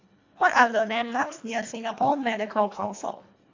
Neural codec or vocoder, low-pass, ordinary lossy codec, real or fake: codec, 24 kHz, 1.5 kbps, HILCodec; 7.2 kHz; AAC, 48 kbps; fake